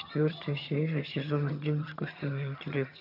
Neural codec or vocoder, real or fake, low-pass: vocoder, 22.05 kHz, 80 mel bands, HiFi-GAN; fake; 5.4 kHz